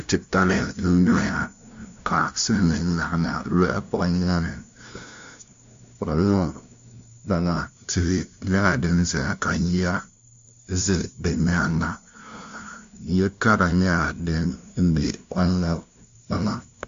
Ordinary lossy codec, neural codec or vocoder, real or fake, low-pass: MP3, 48 kbps; codec, 16 kHz, 0.5 kbps, FunCodec, trained on LibriTTS, 25 frames a second; fake; 7.2 kHz